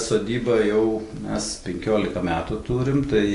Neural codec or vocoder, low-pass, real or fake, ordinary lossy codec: none; 10.8 kHz; real; AAC, 48 kbps